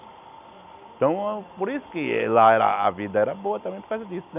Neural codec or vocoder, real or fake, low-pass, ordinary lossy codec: none; real; 3.6 kHz; none